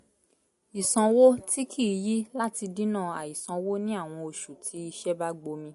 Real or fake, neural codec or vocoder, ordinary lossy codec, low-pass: real; none; MP3, 48 kbps; 14.4 kHz